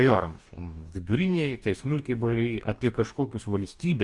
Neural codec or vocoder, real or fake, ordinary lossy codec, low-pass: codec, 44.1 kHz, 2.6 kbps, DAC; fake; Opus, 64 kbps; 10.8 kHz